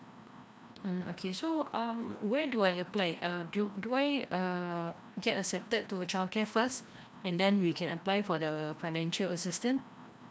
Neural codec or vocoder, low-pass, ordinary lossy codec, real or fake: codec, 16 kHz, 1 kbps, FreqCodec, larger model; none; none; fake